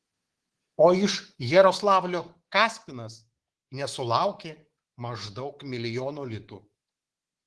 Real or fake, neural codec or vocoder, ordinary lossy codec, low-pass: fake; codec, 24 kHz, 3.1 kbps, DualCodec; Opus, 16 kbps; 10.8 kHz